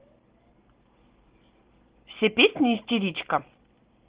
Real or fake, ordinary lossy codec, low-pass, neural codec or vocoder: real; Opus, 16 kbps; 3.6 kHz; none